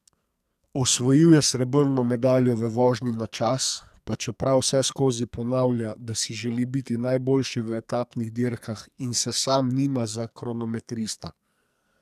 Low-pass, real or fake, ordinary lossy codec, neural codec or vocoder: 14.4 kHz; fake; none; codec, 44.1 kHz, 2.6 kbps, SNAC